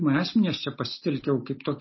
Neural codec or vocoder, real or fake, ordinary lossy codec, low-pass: none; real; MP3, 24 kbps; 7.2 kHz